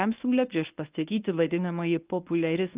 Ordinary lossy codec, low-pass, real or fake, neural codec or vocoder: Opus, 24 kbps; 3.6 kHz; fake; codec, 24 kHz, 0.9 kbps, WavTokenizer, medium speech release version 1